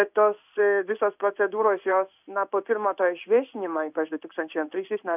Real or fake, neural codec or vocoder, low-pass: fake; codec, 16 kHz in and 24 kHz out, 1 kbps, XY-Tokenizer; 3.6 kHz